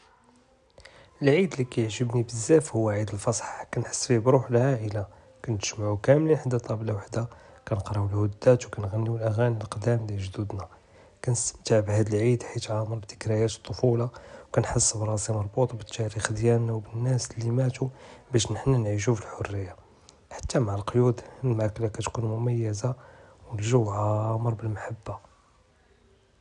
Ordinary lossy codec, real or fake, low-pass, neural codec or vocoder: none; real; 10.8 kHz; none